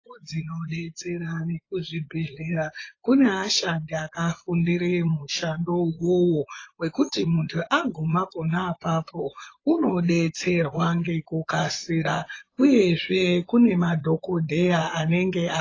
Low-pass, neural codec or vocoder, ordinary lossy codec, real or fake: 7.2 kHz; vocoder, 24 kHz, 100 mel bands, Vocos; AAC, 32 kbps; fake